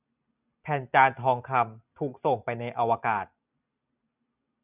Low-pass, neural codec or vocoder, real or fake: 3.6 kHz; none; real